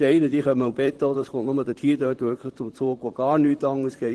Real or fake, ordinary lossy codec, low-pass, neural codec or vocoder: fake; Opus, 16 kbps; 10.8 kHz; vocoder, 44.1 kHz, 128 mel bands, Pupu-Vocoder